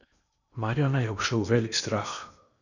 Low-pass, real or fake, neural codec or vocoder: 7.2 kHz; fake; codec, 16 kHz in and 24 kHz out, 0.8 kbps, FocalCodec, streaming, 65536 codes